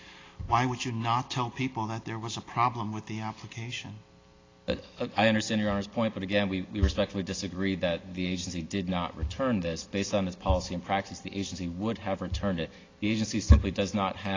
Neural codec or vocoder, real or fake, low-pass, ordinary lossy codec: none; real; 7.2 kHz; AAC, 32 kbps